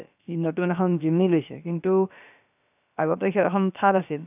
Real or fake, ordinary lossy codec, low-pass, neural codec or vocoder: fake; none; 3.6 kHz; codec, 16 kHz, about 1 kbps, DyCAST, with the encoder's durations